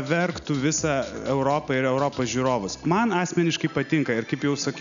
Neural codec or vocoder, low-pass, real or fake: none; 7.2 kHz; real